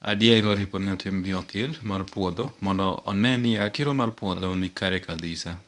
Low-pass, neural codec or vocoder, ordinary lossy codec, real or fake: 10.8 kHz; codec, 24 kHz, 0.9 kbps, WavTokenizer, medium speech release version 1; none; fake